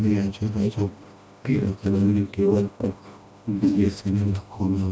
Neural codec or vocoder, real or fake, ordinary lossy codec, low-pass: codec, 16 kHz, 1 kbps, FreqCodec, smaller model; fake; none; none